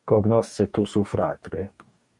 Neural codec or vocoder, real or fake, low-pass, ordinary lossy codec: codec, 44.1 kHz, 2.6 kbps, DAC; fake; 10.8 kHz; MP3, 48 kbps